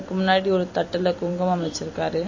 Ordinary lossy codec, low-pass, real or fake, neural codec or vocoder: MP3, 32 kbps; 7.2 kHz; fake; vocoder, 44.1 kHz, 128 mel bands every 256 samples, BigVGAN v2